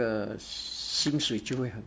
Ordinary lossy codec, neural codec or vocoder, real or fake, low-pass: none; none; real; none